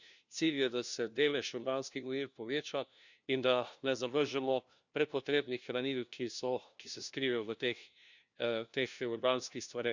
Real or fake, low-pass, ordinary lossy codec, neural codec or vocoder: fake; 7.2 kHz; Opus, 64 kbps; codec, 16 kHz, 1 kbps, FunCodec, trained on LibriTTS, 50 frames a second